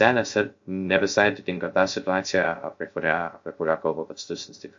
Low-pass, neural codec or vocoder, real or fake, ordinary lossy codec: 7.2 kHz; codec, 16 kHz, 0.3 kbps, FocalCodec; fake; MP3, 48 kbps